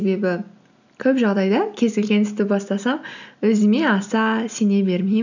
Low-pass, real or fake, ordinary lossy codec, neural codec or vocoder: 7.2 kHz; real; none; none